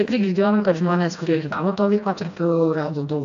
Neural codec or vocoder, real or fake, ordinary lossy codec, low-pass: codec, 16 kHz, 1 kbps, FreqCodec, smaller model; fake; AAC, 64 kbps; 7.2 kHz